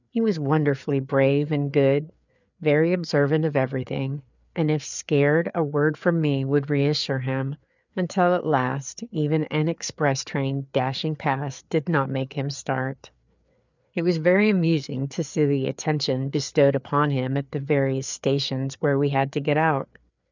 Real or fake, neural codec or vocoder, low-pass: fake; codec, 16 kHz, 4 kbps, FreqCodec, larger model; 7.2 kHz